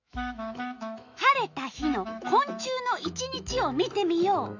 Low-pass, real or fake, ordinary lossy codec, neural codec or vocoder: 7.2 kHz; fake; none; autoencoder, 48 kHz, 128 numbers a frame, DAC-VAE, trained on Japanese speech